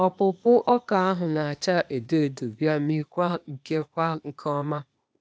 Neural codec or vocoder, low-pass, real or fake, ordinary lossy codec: codec, 16 kHz, 0.8 kbps, ZipCodec; none; fake; none